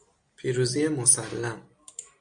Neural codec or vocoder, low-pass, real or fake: none; 9.9 kHz; real